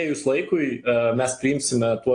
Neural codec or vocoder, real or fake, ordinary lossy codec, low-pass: none; real; AAC, 48 kbps; 9.9 kHz